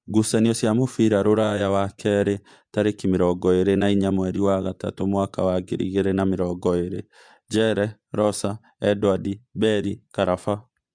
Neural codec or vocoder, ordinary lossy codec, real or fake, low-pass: vocoder, 24 kHz, 100 mel bands, Vocos; MP3, 96 kbps; fake; 9.9 kHz